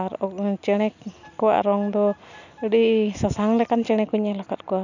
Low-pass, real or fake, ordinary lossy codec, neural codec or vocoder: 7.2 kHz; real; none; none